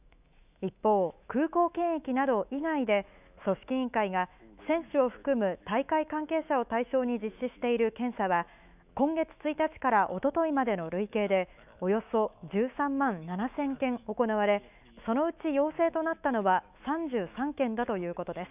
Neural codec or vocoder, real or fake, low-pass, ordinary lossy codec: autoencoder, 48 kHz, 128 numbers a frame, DAC-VAE, trained on Japanese speech; fake; 3.6 kHz; none